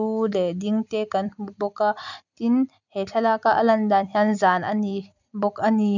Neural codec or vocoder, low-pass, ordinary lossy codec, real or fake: none; 7.2 kHz; none; real